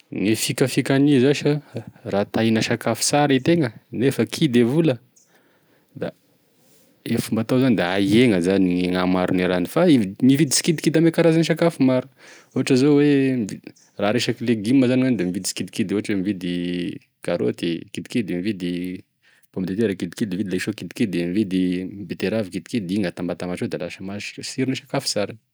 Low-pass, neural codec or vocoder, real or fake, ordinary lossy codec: none; none; real; none